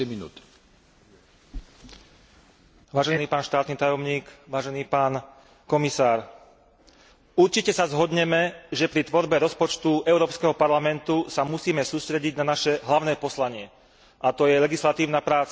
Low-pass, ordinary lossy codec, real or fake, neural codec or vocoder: none; none; real; none